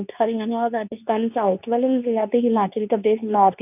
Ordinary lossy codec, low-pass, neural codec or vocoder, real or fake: none; 3.6 kHz; codec, 24 kHz, 0.9 kbps, WavTokenizer, medium speech release version 2; fake